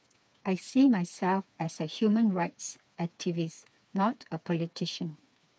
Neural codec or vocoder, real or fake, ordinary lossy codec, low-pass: codec, 16 kHz, 4 kbps, FreqCodec, smaller model; fake; none; none